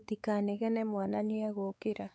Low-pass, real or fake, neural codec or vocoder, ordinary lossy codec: none; fake; codec, 16 kHz, 4 kbps, X-Codec, WavLM features, trained on Multilingual LibriSpeech; none